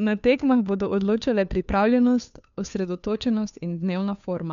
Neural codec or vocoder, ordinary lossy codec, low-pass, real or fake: codec, 16 kHz, 2 kbps, FunCodec, trained on Chinese and English, 25 frames a second; none; 7.2 kHz; fake